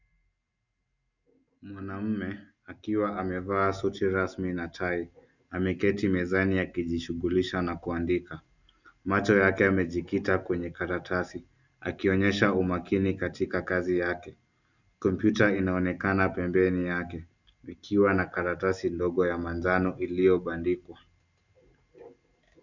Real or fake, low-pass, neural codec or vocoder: real; 7.2 kHz; none